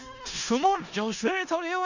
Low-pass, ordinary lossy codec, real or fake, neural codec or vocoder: 7.2 kHz; none; fake; codec, 16 kHz in and 24 kHz out, 0.4 kbps, LongCat-Audio-Codec, four codebook decoder